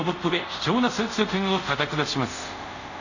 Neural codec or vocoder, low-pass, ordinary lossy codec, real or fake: codec, 24 kHz, 0.5 kbps, DualCodec; 7.2 kHz; none; fake